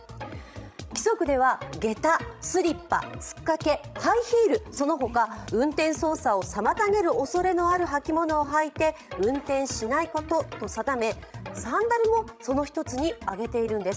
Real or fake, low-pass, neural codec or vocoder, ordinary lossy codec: fake; none; codec, 16 kHz, 16 kbps, FreqCodec, larger model; none